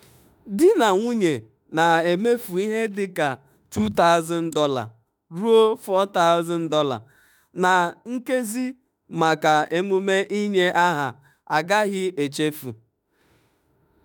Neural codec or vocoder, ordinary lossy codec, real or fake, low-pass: autoencoder, 48 kHz, 32 numbers a frame, DAC-VAE, trained on Japanese speech; none; fake; none